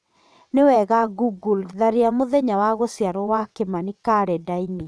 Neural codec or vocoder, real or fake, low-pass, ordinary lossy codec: vocoder, 22.05 kHz, 80 mel bands, WaveNeXt; fake; none; none